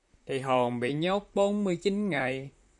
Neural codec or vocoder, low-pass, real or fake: vocoder, 44.1 kHz, 128 mel bands, Pupu-Vocoder; 10.8 kHz; fake